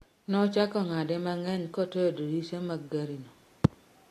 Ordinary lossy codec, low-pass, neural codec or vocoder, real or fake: AAC, 48 kbps; 14.4 kHz; none; real